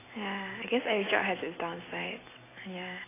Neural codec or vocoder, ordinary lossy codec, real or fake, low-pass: none; AAC, 16 kbps; real; 3.6 kHz